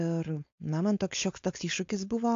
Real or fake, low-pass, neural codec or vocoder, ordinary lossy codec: fake; 7.2 kHz; codec, 16 kHz, 4.8 kbps, FACodec; AAC, 48 kbps